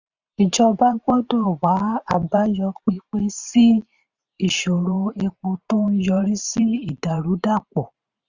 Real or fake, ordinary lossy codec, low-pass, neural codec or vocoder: fake; Opus, 64 kbps; 7.2 kHz; vocoder, 44.1 kHz, 128 mel bands every 512 samples, BigVGAN v2